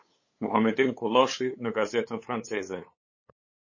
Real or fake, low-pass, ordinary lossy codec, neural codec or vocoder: fake; 7.2 kHz; MP3, 32 kbps; codec, 16 kHz, 8 kbps, FunCodec, trained on LibriTTS, 25 frames a second